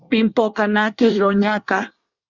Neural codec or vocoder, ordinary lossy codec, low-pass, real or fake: codec, 24 kHz, 1 kbps, SNAC; Opus, 64 kbps; 7.2 kHz; fake